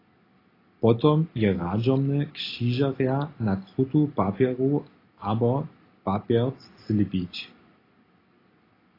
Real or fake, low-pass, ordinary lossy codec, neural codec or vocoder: real; 5.4 kHz; AAC, 24 kbps; none